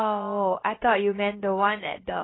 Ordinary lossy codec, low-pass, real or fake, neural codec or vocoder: AAC, 16 kbps; 7.2 kHz; fake; codec, 16 kHz, about 1 kbps, DyCAST, with the encoder's durations